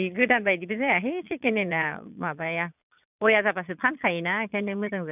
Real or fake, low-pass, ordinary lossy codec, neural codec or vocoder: fake; 3.6 kHz; none; vocoder, 22.05 kHz, 80 mel bands, Vocos